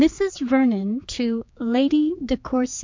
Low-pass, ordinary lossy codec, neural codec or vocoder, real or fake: 7.2 kHz; MP3, 64 kbps; codec, 16 kHz, 4 kbps, X-Codec, HuBERT features, trained on general audio; fake